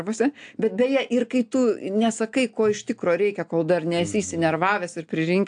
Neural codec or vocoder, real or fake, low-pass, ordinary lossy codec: none; real; 9.9 kHz; AAC, 64 kbps